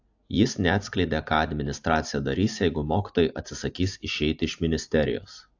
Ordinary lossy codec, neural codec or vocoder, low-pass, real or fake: AAC, 48 kbps; none; 7.2 kHz; real